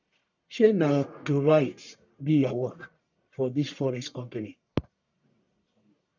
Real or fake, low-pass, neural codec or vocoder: fake; 7.2 kHz; codec, 44.1 kHz, 1.7 kbps, Pupu-Codec